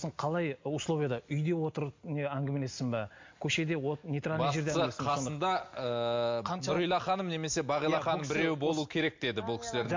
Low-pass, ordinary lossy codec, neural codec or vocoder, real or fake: 7.2 kHz; MP3, 48 kbps; none; real